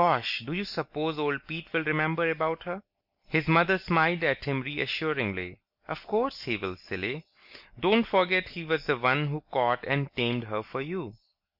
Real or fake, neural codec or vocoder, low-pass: real; none; 5.4 kHz